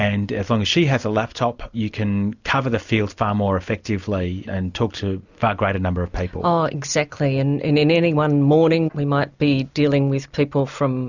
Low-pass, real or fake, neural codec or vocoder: 7.2 kHz; real; none